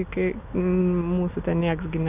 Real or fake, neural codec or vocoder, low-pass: real; none; 3.6 kHz